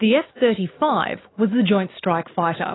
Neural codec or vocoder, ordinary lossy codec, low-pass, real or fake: none; AAC, 16 kbps; 7.2 kHz; real